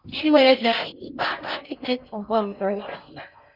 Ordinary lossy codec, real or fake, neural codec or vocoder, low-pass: Opus, 64 kbps; fake; codec, 16 kHz in and 24 kHz out, 0.6 kbps, FocalCodec, streaming, 4096 codes; 5.4 kHz